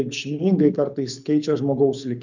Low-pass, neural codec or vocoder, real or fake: 7.2 kHz; codec, 24 kHz, 6 kbps, HILCodec; fake